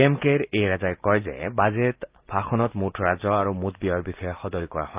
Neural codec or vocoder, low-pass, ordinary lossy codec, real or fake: none; 3.6 kHz; Opus, 32 kbps; real